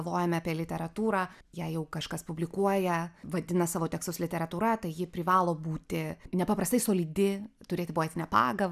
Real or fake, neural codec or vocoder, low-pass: real; none; 14.4 kHz